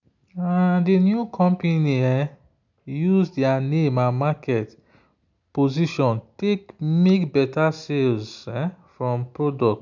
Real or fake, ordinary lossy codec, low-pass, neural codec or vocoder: real; none; 7.2 kHz; none